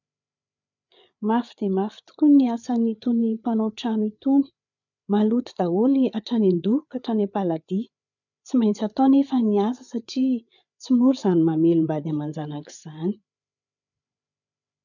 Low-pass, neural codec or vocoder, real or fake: 7.2 kHz; codec, 16 kHz, 8 kbps, FreqCodec, larger model; fake